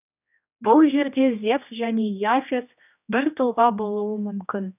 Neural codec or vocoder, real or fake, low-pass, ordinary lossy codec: codec, 16 kHz, 1 kbps, X-Codec, HuBERT features, trained on general audio; fake; 3.6 kHz; none